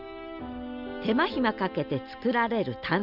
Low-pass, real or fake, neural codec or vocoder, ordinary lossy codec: 5.4 kHz; real; none; none